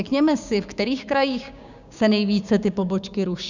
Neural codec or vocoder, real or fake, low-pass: codec, 16 kHz, 6 kbps, DAC; fake; 7.2 kHz